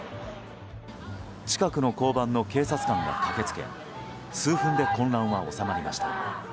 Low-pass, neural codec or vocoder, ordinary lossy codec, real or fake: none; none; none; real